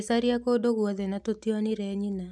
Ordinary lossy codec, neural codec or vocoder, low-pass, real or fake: none; none; none; real